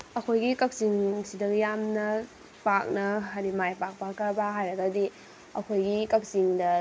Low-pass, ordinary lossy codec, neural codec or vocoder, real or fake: none; none; none; real